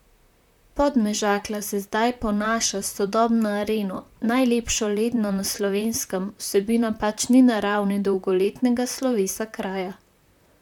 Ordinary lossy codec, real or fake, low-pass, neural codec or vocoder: none; fake; 19.8 kHz; vocoder, 44.1 kHz, 128 mel bands, Pupu-Vocoder